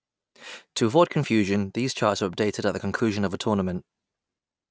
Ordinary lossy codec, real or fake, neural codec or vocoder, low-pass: none; real; none; none